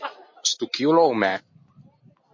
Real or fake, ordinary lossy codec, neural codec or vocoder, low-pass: real; MP3, 32 kbps; none; 7.2 kHz